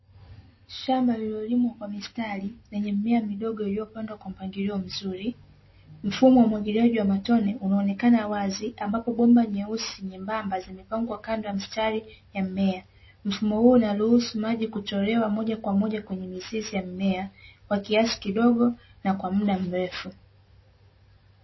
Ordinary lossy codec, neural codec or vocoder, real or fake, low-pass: MP3, 24 kbps; none; real; 7.2 kHz